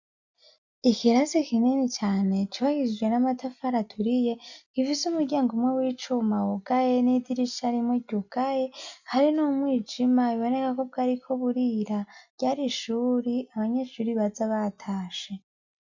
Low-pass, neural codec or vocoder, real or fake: 7.2 kHz; none; real